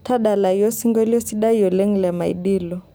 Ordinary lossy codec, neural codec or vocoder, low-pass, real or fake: none; none; none; real